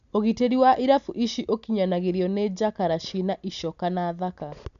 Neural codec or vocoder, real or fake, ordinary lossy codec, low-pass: none; real; none; 7.2 kHz